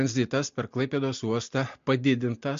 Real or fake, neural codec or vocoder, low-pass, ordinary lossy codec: real; none; 7.2 kHz; MP3, 48 kbps